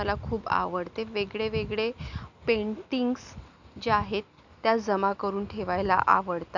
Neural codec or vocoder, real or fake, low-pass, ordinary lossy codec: none; real; 7.2 kHz; none